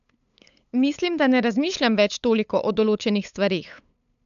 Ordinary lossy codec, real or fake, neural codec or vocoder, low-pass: none; fake; codec, 16 kHz, 8 kbps, FunCodec, trained on LibriTTS, 25 frames a second; 7.2 kHz